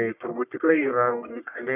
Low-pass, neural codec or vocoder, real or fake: 3.6 kHz; codec, 44.1 kHz, 1.7 kbps, Pupu-Codec; fake